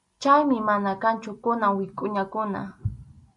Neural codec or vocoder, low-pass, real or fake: none; 10.8 kHz; real